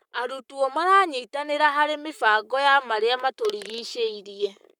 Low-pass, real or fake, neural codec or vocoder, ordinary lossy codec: 19.8 kHz; fake; codec, 44.1 kHz, 7.8 kbps, Pupu-Codec; none